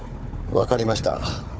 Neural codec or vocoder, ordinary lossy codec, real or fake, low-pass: codec, 16 kHz, 4 kbps, FunCodec, trained on Chinese and English, 50 frames a second; none; fake; none